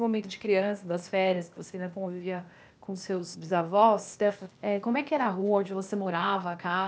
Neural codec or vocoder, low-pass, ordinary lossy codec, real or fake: codec, 16 kHz, 0.8 kbps, ZipCodec; none; none; fake